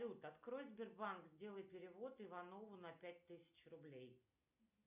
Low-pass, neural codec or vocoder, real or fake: 3.6 kHz; none; real